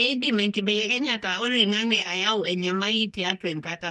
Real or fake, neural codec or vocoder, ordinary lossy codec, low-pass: fake; codec, 24 kHz, 0.9 kbps, WavTokenizer, medium music audio release; none; none